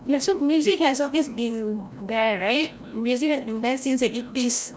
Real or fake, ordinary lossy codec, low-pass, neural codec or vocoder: fake; none; none; codec, 16 kHz, 0.5 kbps, FreqCodec, larger model